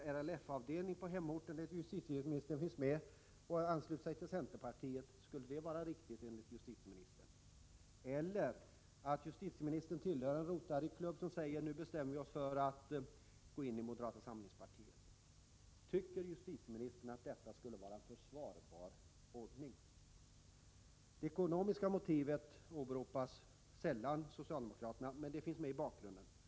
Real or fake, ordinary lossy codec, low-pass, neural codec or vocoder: real; none; none; none